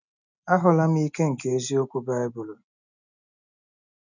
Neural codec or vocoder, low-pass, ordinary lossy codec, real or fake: none; 7.2 kHz; none; real